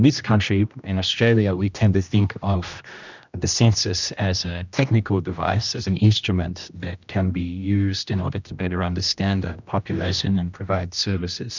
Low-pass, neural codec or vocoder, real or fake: 7.2 kHz; codec, 16 kHz, 1 kbps, X-Codec, HuBERT features, trained on general audio; fake